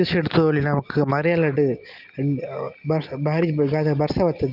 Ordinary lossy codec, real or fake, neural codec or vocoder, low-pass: Opus, 24 kbps; real; none; 5.4 kHz